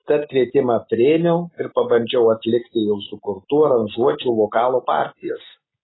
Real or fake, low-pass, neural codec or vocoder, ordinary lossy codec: real; 7.2 kHz; none; AAC, 16 kbps